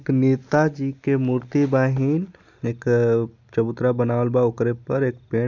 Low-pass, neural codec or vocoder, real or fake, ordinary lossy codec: 7.2 kHz; none; real; none